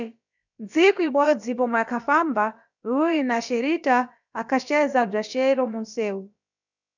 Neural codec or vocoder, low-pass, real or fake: codec, 16 kHz, about 1 kbps, DyCAST, with the encoder's durations; 7.2 kHz; fake